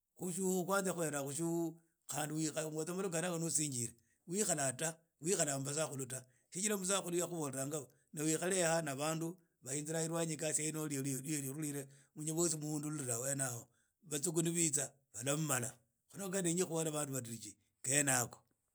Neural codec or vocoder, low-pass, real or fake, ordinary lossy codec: none; none; real; none